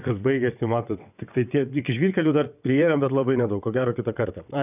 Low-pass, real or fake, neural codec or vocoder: 3.6 kHz; fake; vocoder, 22.05 kHz, 80 mel bands, WaveNeXt